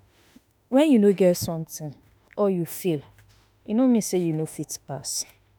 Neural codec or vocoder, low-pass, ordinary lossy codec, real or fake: autoencoder, 48 kHz, 32 numbers a frame, DAC-VAE, trained on Japanese speech; none; none; fake